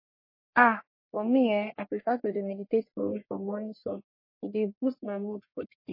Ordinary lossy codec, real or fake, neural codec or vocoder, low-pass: MP3, 24 kbps; fake; codec, 44.1 kHz, 1.7 kbps, Pupu-Codec; 5.4 kHz